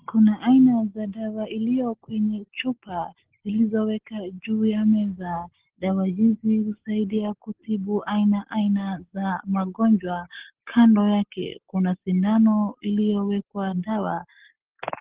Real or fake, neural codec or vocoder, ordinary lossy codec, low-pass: real; none; Opus, 16 kbps; 3.6 kHz